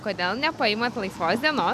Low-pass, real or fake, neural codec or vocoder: 14.4 kHz; real; none